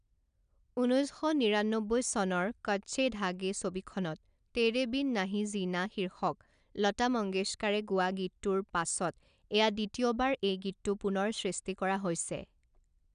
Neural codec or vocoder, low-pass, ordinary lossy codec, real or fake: none; 9.9 kHz; none; real